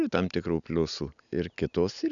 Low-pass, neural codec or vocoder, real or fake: 7.2 kHz; none; real